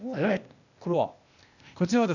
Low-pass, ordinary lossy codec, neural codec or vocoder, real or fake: 7.2 kHz; none; codec, 16 kHz, 0.8 kbps, ZipCodec; fake